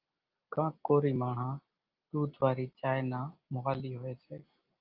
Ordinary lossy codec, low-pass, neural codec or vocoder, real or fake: Opus, 24 kbps; 5.4 kHz; none; real